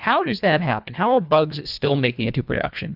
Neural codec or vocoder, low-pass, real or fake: codec, 24 kHz, 1.5 kbps, HILCodec; 5.4 kHz; fake